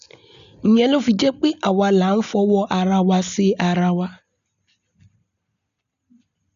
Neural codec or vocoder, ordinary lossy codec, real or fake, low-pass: none; none; real; 7.2 kHz